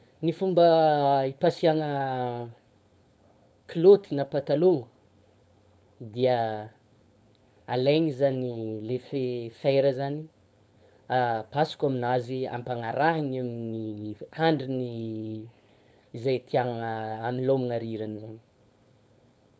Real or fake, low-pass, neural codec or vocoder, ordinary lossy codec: fake; none; codec, 16 kHz, 4.8 kbps, FACodec; none